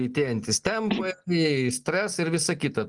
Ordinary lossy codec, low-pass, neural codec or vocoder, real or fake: Opus, 32 kbps; 10.8 kHz; none; real